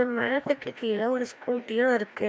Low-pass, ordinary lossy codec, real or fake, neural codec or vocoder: none; none; fake; codec, 16 kHz, 1 kbps, FreqCodec, larger model